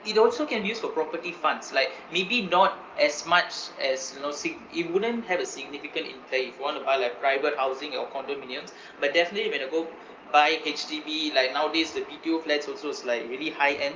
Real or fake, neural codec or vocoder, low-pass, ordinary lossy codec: real; none; 7.2 kHz; Opus, 24 kbps